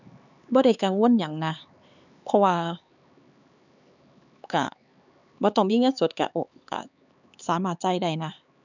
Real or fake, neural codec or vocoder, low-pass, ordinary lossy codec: fake; codec, 16 kHz, 4 kbps, X-Codec, HuBERT features, trained on LibriSpeech; 7.2 kHz; none